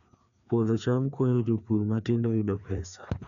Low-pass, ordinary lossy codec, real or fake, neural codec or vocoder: 7.2 kHz; MP3, 96 kbps; fake; codec, 16 kHz, 2 kbps, FreqCodec, larger model